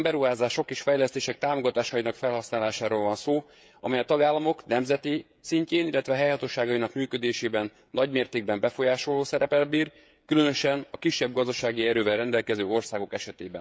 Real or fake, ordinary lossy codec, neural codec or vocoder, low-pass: fake; none; codec, 16 kHz, 16 kbps, FreqCodec, smaller model; none